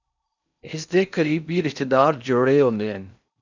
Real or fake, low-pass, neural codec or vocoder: fake; 7.2 kHz; codec, 16 kHz in and 24 kHz out, 0.6 kbps, FocalCodec, streaming, 4096 codes